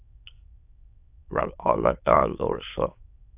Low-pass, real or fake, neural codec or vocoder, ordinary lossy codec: 3.6 kHz; fake; autoencoder, 22.05 kHz, a latent of 192 numbers a frame, VITS, trained on many speakers; AAC, 32 kbps